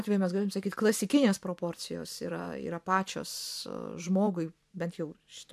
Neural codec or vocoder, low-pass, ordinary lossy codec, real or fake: vocoder, 44.1 kHz, 128 mel bands every 512 samples, BigVGAN v2; 14.4 kHz; AAC, 96 kbps; fake